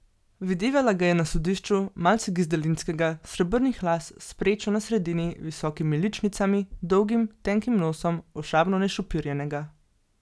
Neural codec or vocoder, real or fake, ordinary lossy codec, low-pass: none; real; none; none